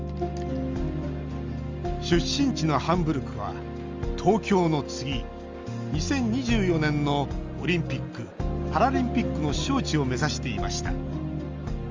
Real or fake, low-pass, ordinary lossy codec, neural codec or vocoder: real; 7.2 kHz; Opus, 32 kbps; none